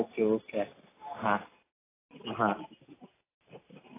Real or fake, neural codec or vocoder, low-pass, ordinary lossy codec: real; none; 3.6 kHz; AAC, 16 kbps